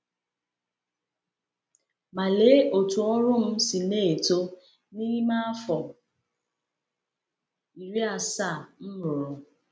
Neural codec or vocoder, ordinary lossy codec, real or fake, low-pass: none; none; real; none